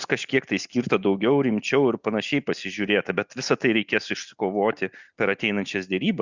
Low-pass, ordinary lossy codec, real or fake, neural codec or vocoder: 7.2 kHz; Opus, 64 kbps; real; none